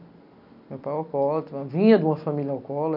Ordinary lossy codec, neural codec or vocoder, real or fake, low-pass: none; none; real; 5.4 kHz